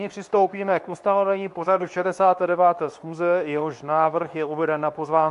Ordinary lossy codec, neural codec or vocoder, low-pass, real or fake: AAC, 64 kbps; codec, 24 kHz, 0.9 kbps, WavTokenizer, medium speech release version 2; 10.8 kHz; fake